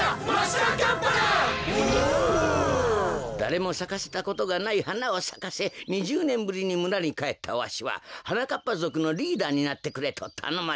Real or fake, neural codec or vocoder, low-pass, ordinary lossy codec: real; none; none; none